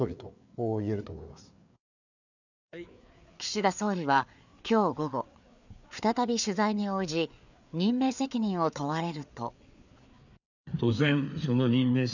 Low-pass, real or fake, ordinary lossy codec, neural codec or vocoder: 7.2 kHz; fake; none; codec, 16 kHz, 4 kbps, FreqCodec, larger model